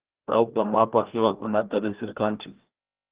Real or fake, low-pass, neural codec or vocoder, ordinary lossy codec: fake; 3.6 kHz; codec, 16 kHz, 1 kbps, FreqCodec, larger model; Opus, 16 kbps